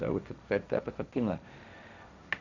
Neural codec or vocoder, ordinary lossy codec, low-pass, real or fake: codec, 16 kHz, 1.1 kbps, Voila-Tokenizer; none; 7.2 kHz; fake